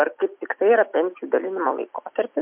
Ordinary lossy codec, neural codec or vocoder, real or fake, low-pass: MP3, 32 kbps; none; real; 3.6 kHz